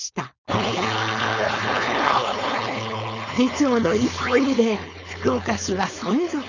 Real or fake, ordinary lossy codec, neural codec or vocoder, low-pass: fake; none; codec, 16 kHz, 4.8 kbps, FACodec; 7.2 kHz